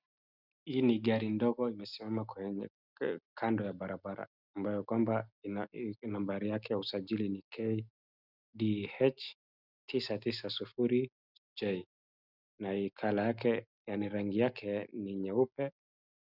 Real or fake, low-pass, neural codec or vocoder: real; 5.4 kHz; none